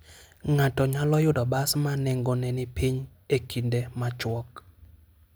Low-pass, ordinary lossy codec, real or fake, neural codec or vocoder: none; none; real; none